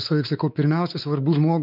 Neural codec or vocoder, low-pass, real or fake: codec, 16 kHz, 4 kbps, X-Codec, WavLM features, trained on Multilingual LibriSpeech; 5.4 kHz; fake